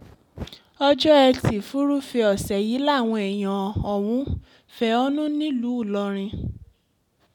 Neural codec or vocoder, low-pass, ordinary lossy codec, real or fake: none; 19.8 kHz; none; real